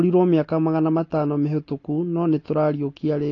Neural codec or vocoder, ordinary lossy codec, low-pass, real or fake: none; AAC, 32 kbps; 7.2 kHz; real